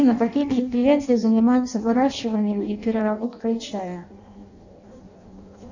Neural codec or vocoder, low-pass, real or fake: codec, 16 kHz in and 24 kHz out, 0.6 kbps, FireRedTTS-2 codec; 7.2 kHz; fake